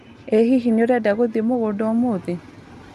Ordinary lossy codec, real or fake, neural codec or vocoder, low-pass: none; real; none; 14.4 kHz